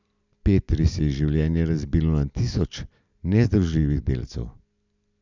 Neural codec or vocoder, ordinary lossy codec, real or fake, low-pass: none; none; real; 7.2 kHz